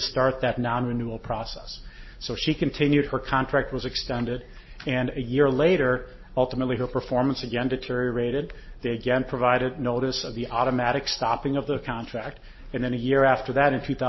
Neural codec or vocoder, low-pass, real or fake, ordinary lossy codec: none; 7.2 kHz; real; MP3, 24 kbps